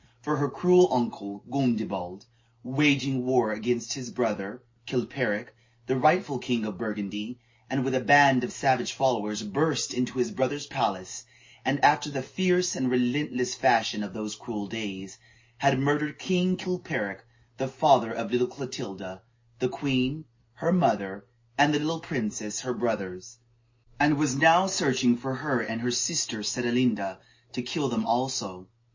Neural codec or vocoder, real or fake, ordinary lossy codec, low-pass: none; real; MP3, 32 kbps; 7.2 kHz